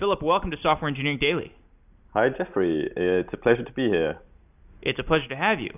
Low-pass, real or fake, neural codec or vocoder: 3.6 kHz; real; none